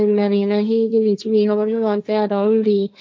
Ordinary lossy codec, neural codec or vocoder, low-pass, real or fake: none; codec, 16 kHz, 1.1 kbps, Voila-Tokenizer; none; fake